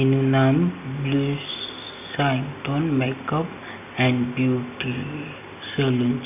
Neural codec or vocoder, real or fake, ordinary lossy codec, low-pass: none; real; none; 3.6 kHz